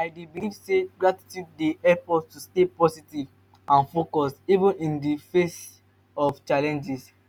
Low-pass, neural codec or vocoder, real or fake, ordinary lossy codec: 19.8 kHz; none; real; none